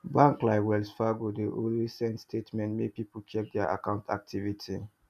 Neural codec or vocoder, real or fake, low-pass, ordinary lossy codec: none; real; 14.4 kHz; none